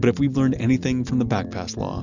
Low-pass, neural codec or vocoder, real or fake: 7.2 kHz; none; real